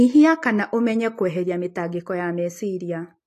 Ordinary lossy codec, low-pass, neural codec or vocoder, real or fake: AAC, 48 kbps; 14.4 kHz; none; real